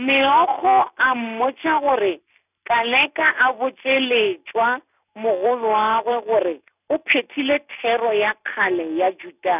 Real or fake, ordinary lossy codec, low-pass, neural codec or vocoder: real; none; 3.6 kHz; none